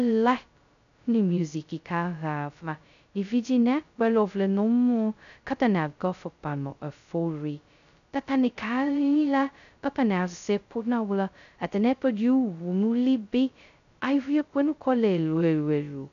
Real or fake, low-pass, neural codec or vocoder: fake; 7.2 kHz; codec, 16 kHz, 0.2 kbps, FocalCodec